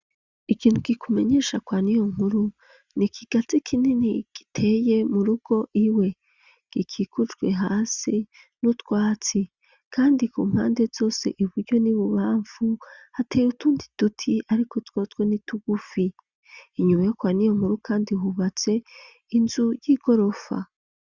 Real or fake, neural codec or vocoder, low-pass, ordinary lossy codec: real; none; 7.2 kHz; Opus, 64 kbps